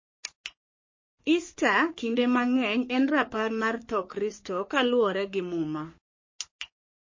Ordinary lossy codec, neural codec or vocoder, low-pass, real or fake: MP3, 32 kbps; codec, 44.1 kHz, 3.4 kbps, Pupu-Codec; 7.2 kHz; fake